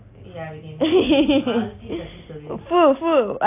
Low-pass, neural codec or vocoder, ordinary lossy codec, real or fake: 3.6 kHz; none; AAC, 24 kbps; real